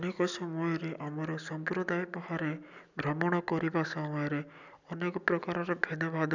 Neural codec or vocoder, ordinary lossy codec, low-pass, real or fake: none; none; 7.2 kHz; real